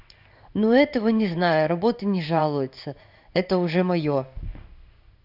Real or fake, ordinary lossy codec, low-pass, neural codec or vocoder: fake; none; 5.4 kHz; codec, 16 kHz in and 24 kHz out, 1 kbps, XY-Tokenizer